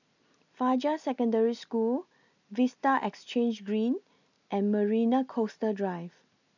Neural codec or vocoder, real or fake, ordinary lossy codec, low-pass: none; real; none; 7.2 kHz